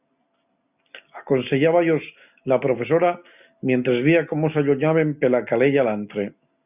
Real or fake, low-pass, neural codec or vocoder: real; 3.6 kHz; none